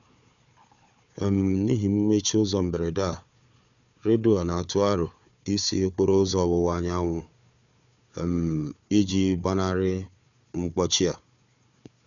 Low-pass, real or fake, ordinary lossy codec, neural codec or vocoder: 7.2 kHz; fake; none; codec, 16 kHz, 4 kbps, FunCodec, trained on Chinese and English, 50 frames a second